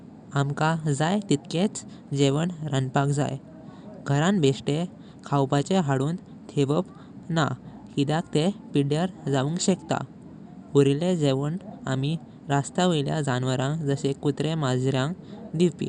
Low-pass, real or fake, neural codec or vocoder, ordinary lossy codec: 10.8 kHz; real; none; none